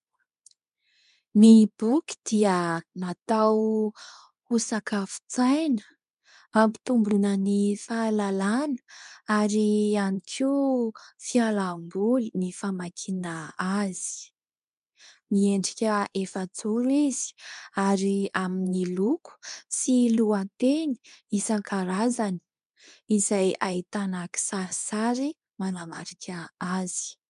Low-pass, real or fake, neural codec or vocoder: 10.8 kHz; fake; codec, 24 kHz, 0.9 kbps, WavTokenizer, medium speech release version 2